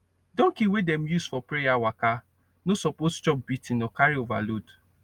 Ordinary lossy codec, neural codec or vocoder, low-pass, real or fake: Opus, 32 kbps; none; 19.8 kHz; real